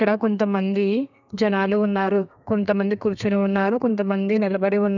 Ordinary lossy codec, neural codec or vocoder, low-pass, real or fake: none; codec, 32 kHz, 1.9 kbps, SNAC; 7.2 kHz; fake